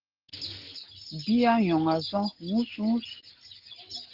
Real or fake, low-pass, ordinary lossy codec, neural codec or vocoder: real; 5.4 kHz; Opus, 16 kbps; none